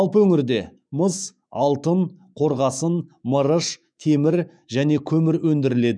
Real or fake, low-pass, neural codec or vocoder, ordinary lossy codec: fake; 9.9 kHz; autoencoder, 48 kHz, 128 numbers a frame, DAC-VAE, trained on Japanese speech; none